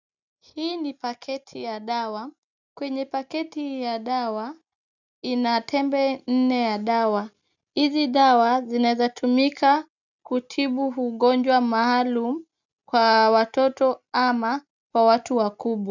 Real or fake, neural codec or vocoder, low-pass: real; none; 7.2 kHz